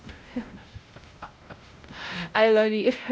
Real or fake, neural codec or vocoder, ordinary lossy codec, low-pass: fake; codec, 16 kHz, 0.5 kbps, X-Codec, WavLM features, trained on Multilingual LibriSpeech; none; none